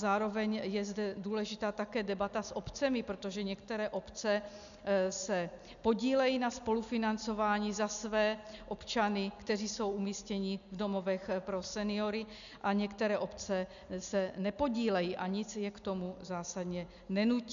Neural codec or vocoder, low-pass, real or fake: none; 7.2 kHz; real